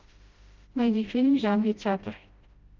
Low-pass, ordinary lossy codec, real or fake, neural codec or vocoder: 7.2 kHz; Opus, 32 kbps; fake; codec, 16 kHz, 0.5 kbps, FreqCodec, smaller model